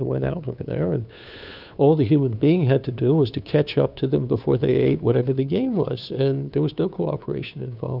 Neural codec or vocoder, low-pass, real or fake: codec, 16 kHz, 2 kbps, FunCodec, trained on Chinese and English, 25 frames a second; 5.4 kHz; fake